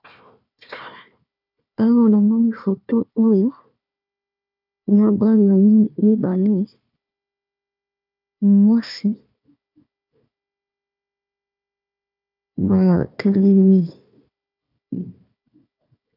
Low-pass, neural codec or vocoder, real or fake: 5.4 kHz; codec, 16 kHz, 1 kbps, FunCodec, trained on Chinese and English, 50 frames a second; fake